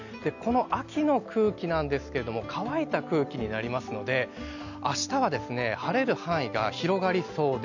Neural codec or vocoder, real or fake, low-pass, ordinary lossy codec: none; real; 7.2 kHz; none